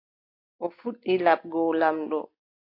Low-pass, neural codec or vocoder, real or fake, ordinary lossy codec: 5.4 kHz; none; real; AAC, 32 kbps